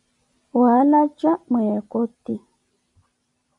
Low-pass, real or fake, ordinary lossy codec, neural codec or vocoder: 10.8 kHz; fake; MP3, 64 kbps; vocoder, 44.1 kHz, 128 mel bands every 256 samples, BigVGAN v2